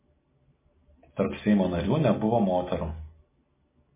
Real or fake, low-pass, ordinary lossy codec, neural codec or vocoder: real; 3.6 kHz; MP3, 16 kbps; none